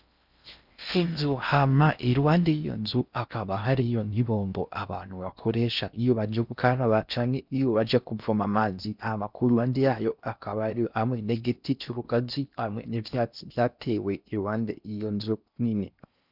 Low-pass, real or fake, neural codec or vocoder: 5.4 kHz; fake; codec, 16 kHz in and 24 kHz out, 0.6 kbps, FocalCodec, streaming, 4096 codes